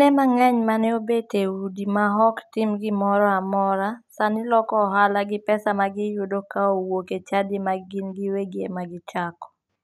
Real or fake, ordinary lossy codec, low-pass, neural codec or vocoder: real; none; 14.4 kHz; none